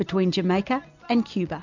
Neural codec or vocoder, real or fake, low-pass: none; real; 7.2 kHz